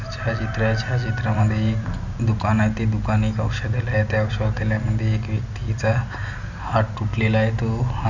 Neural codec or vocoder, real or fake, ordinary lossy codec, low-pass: none; real; none; 7.2 kHz